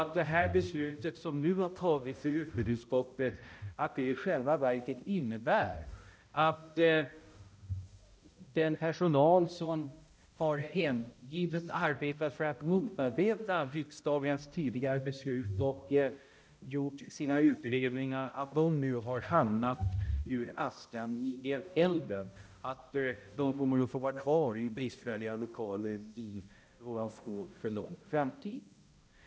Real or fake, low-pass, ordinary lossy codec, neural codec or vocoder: fake; none; none; codec, 16 kHz, 0.5 kbps, X-Codec, HuBERT features, trained on balanced general audio